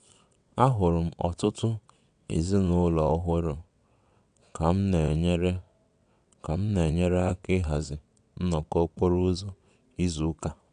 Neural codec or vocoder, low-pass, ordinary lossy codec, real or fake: vocoder, 22.05 kHz, 80 mel bands, WaveNeXt; 9.9 kHz; none; fake